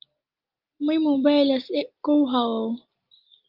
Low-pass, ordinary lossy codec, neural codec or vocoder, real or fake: 5.4 kHz; Opus, 32 kbps; none; real